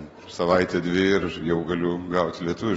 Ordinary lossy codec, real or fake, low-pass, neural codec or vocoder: AAC, 24 kbps; real; 19.8 kHz; none